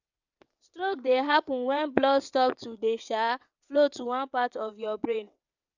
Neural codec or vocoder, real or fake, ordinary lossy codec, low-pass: vocoder, 22.05 kHz, 80 mel bands, WaveNeXt; fake; none; 7.2 kHz